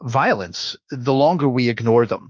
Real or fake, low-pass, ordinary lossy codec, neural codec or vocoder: fake; 7.2 kHz; Opus, 24 kbps; autoencoder, 48 kHz, 32 numbers a frame, DAC-VAE, trained on Japanese speech